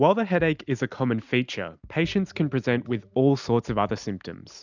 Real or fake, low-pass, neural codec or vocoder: real; 7.2 kHz; none